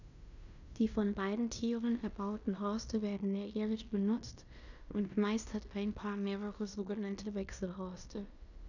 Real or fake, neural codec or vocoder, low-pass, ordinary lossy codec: fake; codec, 16 kHz in and 24 kHz out, 0.9 kbps, LongCat-Audio-Codec, fine tuned four codebook decoder; 7.2 kHz; none